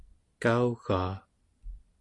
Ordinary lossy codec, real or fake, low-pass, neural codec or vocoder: Opus, 64 kbps; real; 10.8 kHz; none